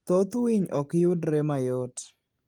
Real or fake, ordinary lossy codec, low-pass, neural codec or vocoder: real; Opus, 24 kbps; 19.8 kHz; none